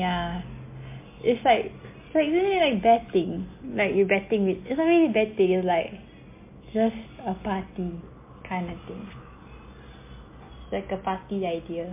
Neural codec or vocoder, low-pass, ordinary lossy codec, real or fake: none; 3.6 kHz; MP3, 24 kbps; real